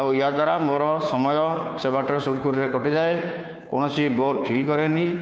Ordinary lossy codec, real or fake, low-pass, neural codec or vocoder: none; fake; none; codec, 16 kHz, 2 kbps, FunCodec, trained on Chinese and English, 25 frames a second